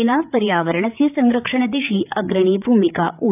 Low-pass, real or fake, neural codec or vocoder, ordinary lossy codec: 3.6 kHz; fake; codec, 16 kHz, 8 kbps, FreqCodec, larger model; none